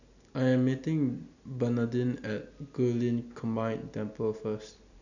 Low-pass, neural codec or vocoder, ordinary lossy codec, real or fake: 7.2 kHz; none; none; real